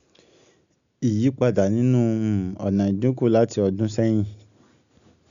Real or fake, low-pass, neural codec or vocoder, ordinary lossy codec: real; 7.2 kHz; none; none